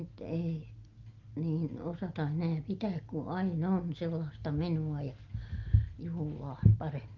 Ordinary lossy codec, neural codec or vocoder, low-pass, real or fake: Opus, 32 kbps; none; 7.2 kHz; real